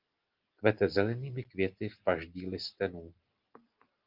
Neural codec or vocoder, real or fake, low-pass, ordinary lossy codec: none; real; 5.4 kHz; Opus, 24 kbps